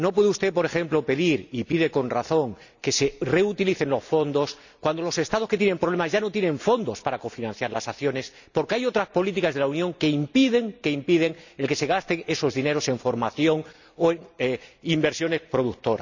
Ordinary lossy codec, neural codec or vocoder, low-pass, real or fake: none; none; 7.2 kHz; real